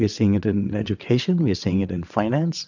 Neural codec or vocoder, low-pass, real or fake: vocoder, 22.05 kHz, 80 mel bands, WaveNeXt; 7.2 kHz; fake